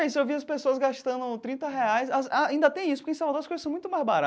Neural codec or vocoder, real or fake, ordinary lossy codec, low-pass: none; real; none; none